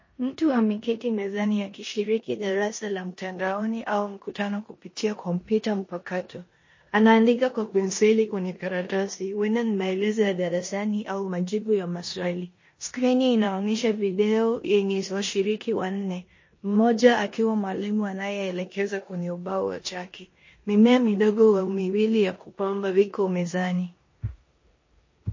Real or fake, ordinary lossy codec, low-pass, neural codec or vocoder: fake; MP3, 32 kbps; 7.2 kHz; codec, 16 kHz in and 24 kHz out, 0.9 kbps, LongCat-Audio-Codec, four codebook decoder